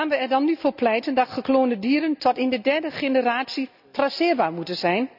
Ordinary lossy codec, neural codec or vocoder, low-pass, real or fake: none; none; 5.4 kHz; real